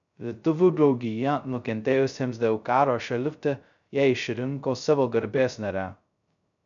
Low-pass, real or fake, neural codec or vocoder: 7.2 kHz; fake; codec, 16 kHz, 0.2 kbps, FocalCodec